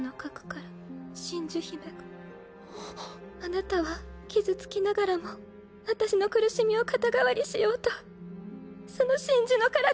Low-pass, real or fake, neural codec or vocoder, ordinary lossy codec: none; real; none; none